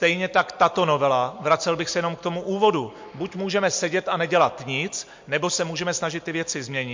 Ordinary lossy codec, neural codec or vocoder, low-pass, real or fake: MP3, 48 kbps; none; 7.2 kHz; real